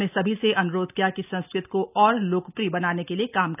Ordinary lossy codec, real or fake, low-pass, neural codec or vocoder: none; real; 3.6 kHz; none